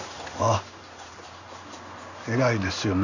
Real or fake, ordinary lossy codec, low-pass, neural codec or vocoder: fake; none; 7.2 kHz; codec, 16 kHz in and 24 kHz out, 1 kbps, XY-Tokenizer